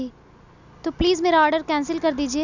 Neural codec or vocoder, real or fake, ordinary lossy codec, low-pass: none; real; none; 7.2 kHz